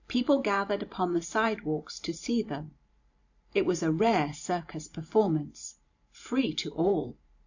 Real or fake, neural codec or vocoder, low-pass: fake; vocoder, 44.1 kHz, 128 mel bands every 512 samples, BigVGAN v2; 7.2 kHz